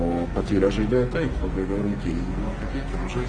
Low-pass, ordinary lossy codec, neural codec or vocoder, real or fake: 9.9 kHz; Opus, 24 kbps; codec, 44.1 kHz, 2.6 kbps, SNAC; fake